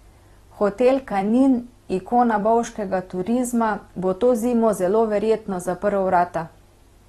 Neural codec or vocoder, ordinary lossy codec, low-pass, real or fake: vocoder, 44.1 kHz, 128 mel bands every 256 samples, BigVGAN v2; AAC, 32 kbps; 19.8 kHz; fake